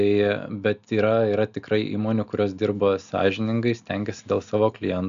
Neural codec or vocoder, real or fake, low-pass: none; real; 7.2 kHz